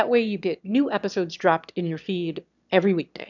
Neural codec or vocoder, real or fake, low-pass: autoencoder, 22.05 kHz, a latent of 192 numbers a frame, VITS, trained on one speaker; fake; 7.2 kHz